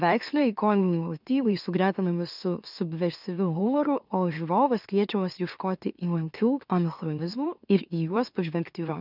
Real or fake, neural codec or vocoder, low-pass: fake; autoencoder, 44.1 kHz, a latent of 192 numbers a frame, MeloTTS; 5.4 kHz